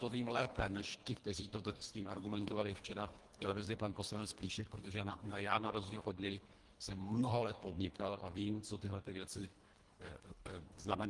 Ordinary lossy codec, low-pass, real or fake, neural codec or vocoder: Opus, 24 kbps; 10.8 kHz; fake; codec, 24 kHz, 1.5 kbps, HILCodec